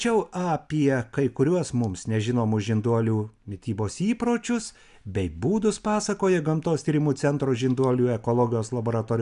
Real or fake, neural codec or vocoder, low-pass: real; none; 10.8 kHz